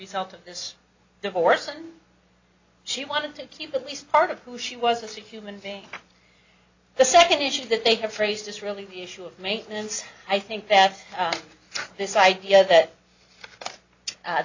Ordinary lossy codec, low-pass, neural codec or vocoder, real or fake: AAC, 48 kbps; 7.2 kHz; none; real